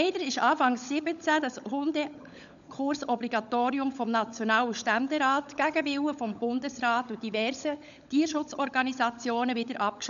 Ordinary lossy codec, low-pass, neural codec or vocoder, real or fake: none; 7.2 kHz; codec, 16 kHz, 16 kbps, FunCodec, trained on LibriTTS, 50 frames a second; fake